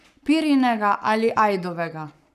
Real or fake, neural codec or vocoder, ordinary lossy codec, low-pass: fake; codec, 44.1 kHz, 7.8 kbps, Pupu-Codec; none; 14.4 kHz